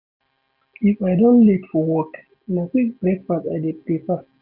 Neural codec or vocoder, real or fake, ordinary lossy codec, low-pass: none; real; none; 5.4 kHz